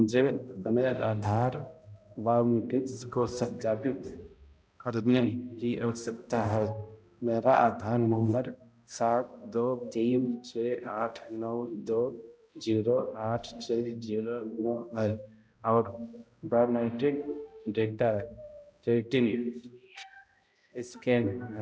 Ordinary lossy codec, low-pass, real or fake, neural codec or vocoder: none; none; fake; codec, 16 kHz, 0.5 kbps, X-Codec, HuBERT features, trained on balanced general audio